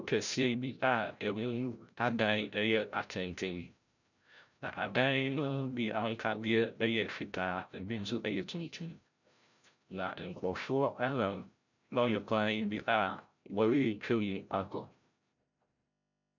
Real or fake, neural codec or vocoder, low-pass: fake; codec, 16 kHz, 0.5 kbps, FreqCodec, larger model; 7.2 kHz